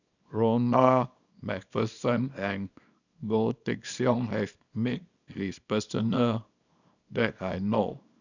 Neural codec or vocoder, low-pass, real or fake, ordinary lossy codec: codec, 24 kHz, 0.9 kbps, WavTokenizer, small release; 7.2 kHz; fake; none